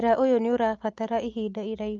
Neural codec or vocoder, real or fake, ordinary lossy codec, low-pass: none; real; Opus, 32 kbps; 7.2 kHz